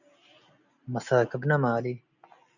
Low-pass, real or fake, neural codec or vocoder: 7.2 kHz; real; none